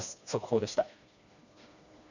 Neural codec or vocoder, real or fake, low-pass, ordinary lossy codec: codec, 16 kHz, 2 kbps, FreqCodec, smaller model; fake; 7.2 kHz; none